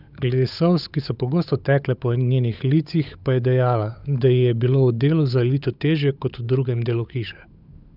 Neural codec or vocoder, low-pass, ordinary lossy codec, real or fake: codec, 16 kHz, 8 kbps, FunCodec, trained on Chinese and English, 25 frames a second; 5.4 kHz; none; fake